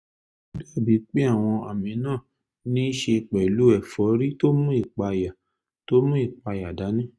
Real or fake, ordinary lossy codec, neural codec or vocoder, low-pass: real; none; none; none